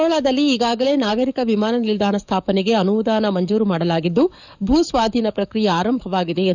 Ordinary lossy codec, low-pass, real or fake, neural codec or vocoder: none; 7.2 kHz; fake; codec, 44.1 kHz, 7.8 kbps, DAC